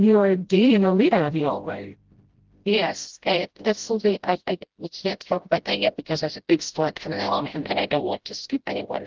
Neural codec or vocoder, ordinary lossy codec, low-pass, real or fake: codec, 16 kHz, 0.5 kbps, FreqCodec, smaller model; Opus, 16 kbps; 7.2 kHz; fake